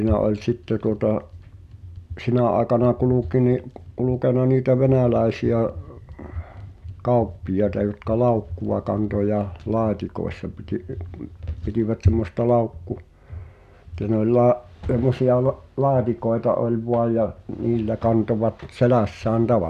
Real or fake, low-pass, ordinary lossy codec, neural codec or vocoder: real; 14.4 kHz; none; none